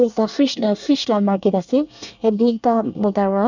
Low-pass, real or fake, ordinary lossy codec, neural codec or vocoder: 7.2 kHz; fake; none; codec, 24 kHz, 1 kbps, SNAC